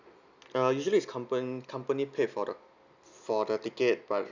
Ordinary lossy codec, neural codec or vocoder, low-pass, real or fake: none; none; 7.2 kHz; real